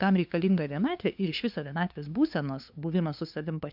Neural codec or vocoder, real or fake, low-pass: codec, 16 kHz, 2 kbps, FunCodec, trained on LibriTTS, 25 frames a second; fake; 5.4 kHz